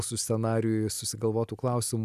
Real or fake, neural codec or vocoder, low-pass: real; none; 14.4 kHz